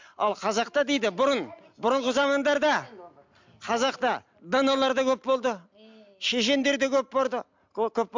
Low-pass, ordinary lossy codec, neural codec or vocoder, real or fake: 7.2 kHz; MP3, 64 kbps; none; real